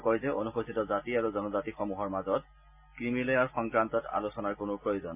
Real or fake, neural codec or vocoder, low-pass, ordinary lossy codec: real; none; 3.6 kHz; MP3, 32 kbps